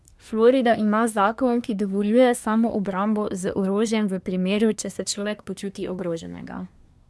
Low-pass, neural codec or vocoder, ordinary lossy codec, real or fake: none; codec, 24 kHz, 1 kbps, SNAC; none; fake